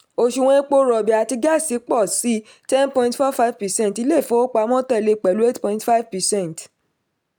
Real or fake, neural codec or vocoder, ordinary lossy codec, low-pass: real; none; none; none